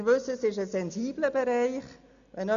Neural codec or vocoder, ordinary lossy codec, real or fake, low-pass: none; none; real; 7.2 kHz